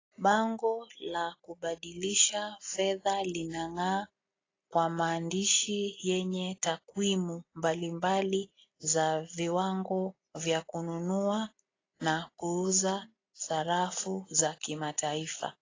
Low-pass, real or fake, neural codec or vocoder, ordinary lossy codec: 7.2 kHz; real; none; AAC, 32 kbps